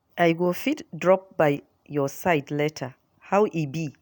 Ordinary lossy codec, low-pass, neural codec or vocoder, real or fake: none; none; none; real